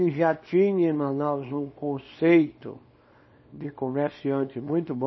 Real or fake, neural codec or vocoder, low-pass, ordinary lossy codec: fake; codec, 24 kHz, 0.9 kbps, WavTokenizer, small release; 7.2 kHz; MP3, 24 kbps